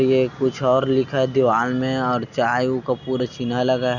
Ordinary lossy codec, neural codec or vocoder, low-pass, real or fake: none; none; 7.2 kHz; real